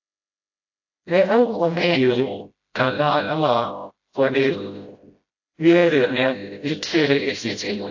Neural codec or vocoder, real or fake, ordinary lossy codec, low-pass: codec, 16 kHz, 0.5 kbps, FreqCodec, smaller model; fake; AAC, 48 kbps; 7.2 kHz